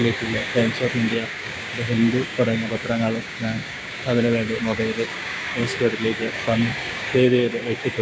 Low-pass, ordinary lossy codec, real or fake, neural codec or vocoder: none; none; fake; codec, 16 kHz, 6 kbps, DAC